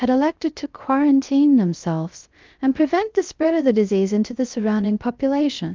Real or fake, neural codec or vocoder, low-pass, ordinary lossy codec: fake; codec, 16 kHz, 0.3 kbps, FocalCodec; 7.2 kHz; Opus, 24 kbps